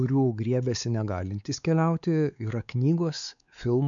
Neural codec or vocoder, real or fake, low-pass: codec, 16 kHz, 4 kbps, X-Codec, WavLM features, trained on Multilingual LibriSpeech; fake; 7.2 kHz